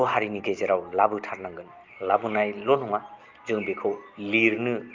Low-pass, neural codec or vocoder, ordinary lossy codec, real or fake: 7.2 kHz; none; Opus, 24 kbps; real